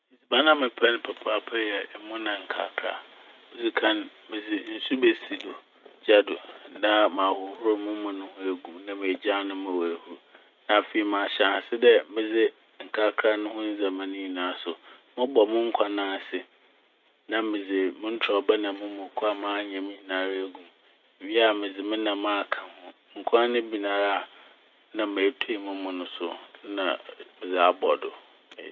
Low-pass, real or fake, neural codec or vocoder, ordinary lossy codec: 7.2 kHz; real; none; none